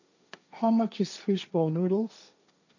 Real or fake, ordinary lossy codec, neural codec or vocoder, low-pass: fake; none; codec, 16 kHz, 1.1 kbps, Voila-Tokenizer; 7.2 kHz